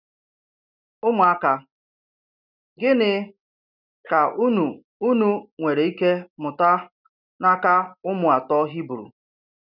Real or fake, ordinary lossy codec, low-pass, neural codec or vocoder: real; none; 5.4 kHz; none